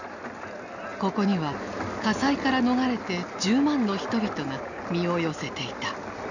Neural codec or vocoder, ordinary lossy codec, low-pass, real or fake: none; none; 7.2 kHz; real